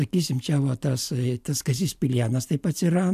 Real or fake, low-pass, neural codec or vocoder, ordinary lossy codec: fake; 14.4 kHz; vocoder, 44.1 kHz, 128 mel bands every 512 samples, BigVGAN v2; AAC, 96 kbps